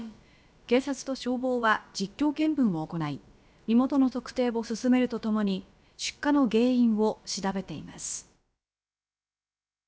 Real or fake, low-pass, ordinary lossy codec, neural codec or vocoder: fake; none; none; codec, 16 kHz, about 1 kbps, DyCAST, with the encoder's durations